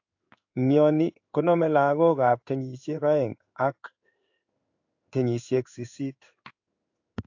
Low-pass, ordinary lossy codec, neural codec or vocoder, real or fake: 7.2 kHz; AAC, 48 kbps; codec, 16 kHz in and 24 kHz out, 1 kbps, XY-Tokenizer; fake